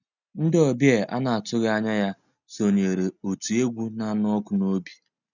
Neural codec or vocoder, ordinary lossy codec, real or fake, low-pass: none; none; real; 7.2 kHz